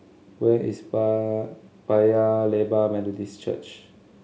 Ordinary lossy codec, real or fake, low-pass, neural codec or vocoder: none; real; none; none